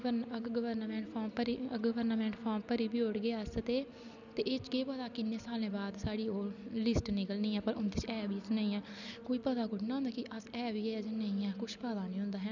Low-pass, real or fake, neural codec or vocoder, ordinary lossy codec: 7.2 kHz; real; none; none